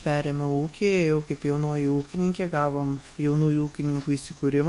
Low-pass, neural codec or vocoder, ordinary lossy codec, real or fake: 10.8 kHz; codec, 24 kHz, 1.2 kbps, DualCodec; MP3, 48 kbps; fake